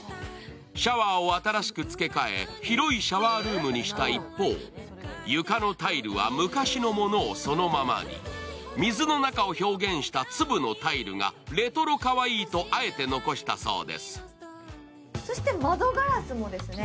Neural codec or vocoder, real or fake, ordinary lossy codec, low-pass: none; real; none; none